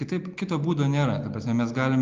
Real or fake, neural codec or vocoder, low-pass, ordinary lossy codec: real; none; 7.2 kHz; Opus, 32 kbps